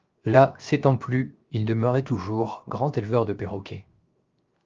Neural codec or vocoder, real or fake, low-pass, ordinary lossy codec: codec, 16 kHz, 0.7 kbps, FocalCodec; fake; 7.2 kHz; Opus, 24 kbps